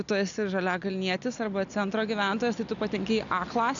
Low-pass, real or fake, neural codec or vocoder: 7.2 kHz; real; none